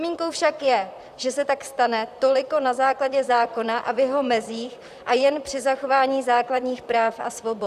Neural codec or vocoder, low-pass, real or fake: vocoder, 44.1 kHz, 128 mel bands, Pupu-Vocoder; 14.4 kHz; fake